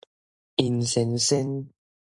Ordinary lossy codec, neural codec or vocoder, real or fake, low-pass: AAC, 64 kbps; vocoder, 44.1 kHz, 128 mel bands every 256 samples, BigVGAN v2; fake; 10.8 kHz